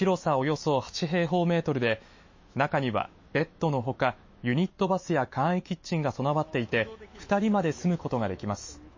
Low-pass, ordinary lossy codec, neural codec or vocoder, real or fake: 7.2 kHz; MP3, 32 kbps; autoencoder, 48 kHz, 128 numbers a frame, DAC-VAE, trained on Japanese speech; fake